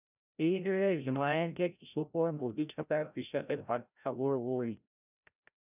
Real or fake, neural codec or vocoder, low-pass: fake; codec, 16 kHz, 0.5 kbps, FreqCodec, larger model; 3.6 kHz